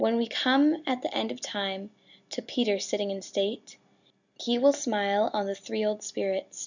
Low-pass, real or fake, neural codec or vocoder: 7.2 kHz; real; none